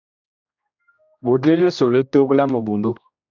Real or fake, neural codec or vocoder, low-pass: fake; codec, 16 kHz, 1 kbps, X-Codec, HuBERT features, trained on general audio; 7.2 kHz